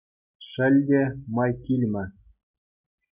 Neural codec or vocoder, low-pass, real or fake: none; 3.6 kHz; real